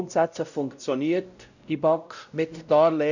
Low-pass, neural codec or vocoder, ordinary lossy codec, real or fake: 7.2 kHz; codec, 16 kHz, 0.5 kbps, X-Codec, WavLM features, trained on Multilingual LibriSpeech; none; fake